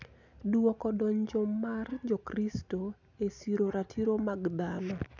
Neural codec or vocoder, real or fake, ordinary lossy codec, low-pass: none; real; none; 7.2 kHz